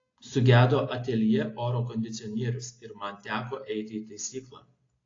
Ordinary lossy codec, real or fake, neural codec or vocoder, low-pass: AAC, 32 kbps; real; none; 7.2 kHz